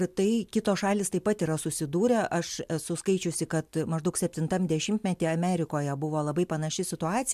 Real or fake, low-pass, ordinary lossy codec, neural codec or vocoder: real; 14.4 kHz; MP3, 96 kbps; none